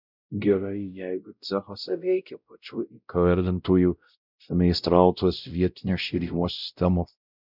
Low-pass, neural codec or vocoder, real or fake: 5.4 kHz; codec, 16 kHz, 0.5 kbps, X-Codec, WavLM features, trained on Multilingual LibriSpeech; fake